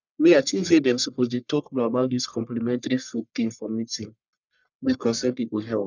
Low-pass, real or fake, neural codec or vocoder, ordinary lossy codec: 7.2 kHz; fake; codec, 44.1 kHz, 1.7 kbps, Pupu-Codec; none